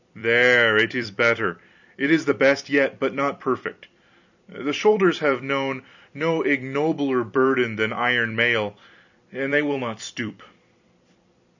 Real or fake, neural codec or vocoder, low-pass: fake; vocoder, 44.1 kHz, 128 mel bands every 256 samples, BigVGAN v2; 7.2 kHz